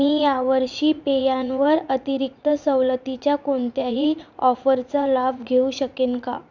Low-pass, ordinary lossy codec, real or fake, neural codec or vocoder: 7.2 kHz; none; fake; vocoder, 44.1 kHz, 80 mel bands, Vocos